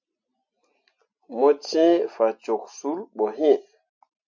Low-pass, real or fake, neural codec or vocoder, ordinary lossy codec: 7.2 kHz; real; none; MP3, 48 kbps